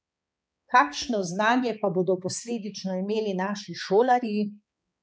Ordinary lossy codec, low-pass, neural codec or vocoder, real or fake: none; none; codec, 16 kHz, 4 kbps, X-Codec, HuBERT features, trained on balanced general audio; fake